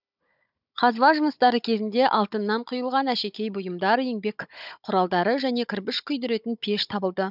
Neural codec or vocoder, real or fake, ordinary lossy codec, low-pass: codec, 16 kHz, 16 kbps, FunCodec, trained on Chinese and English, 50 frames a second; fake; none; 5.4 kHz